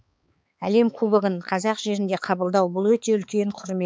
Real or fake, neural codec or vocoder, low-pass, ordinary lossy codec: fake; codec, 16 kHz, 4 kbps, X-Codec, HuBERT features, trained on balanced general audio; none; none